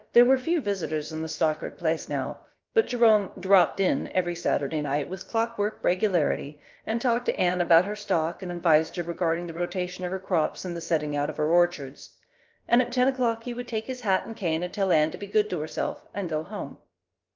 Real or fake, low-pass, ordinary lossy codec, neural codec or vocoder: fake; 7.2 kHz; Opus, 24 kbps; codec, 16 kHz, about 1 kbps, DyCAST, with the encoder's durations